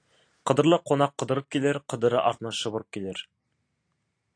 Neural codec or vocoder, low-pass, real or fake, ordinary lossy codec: none; 9.9 kHz; real; AAC, 48 kbps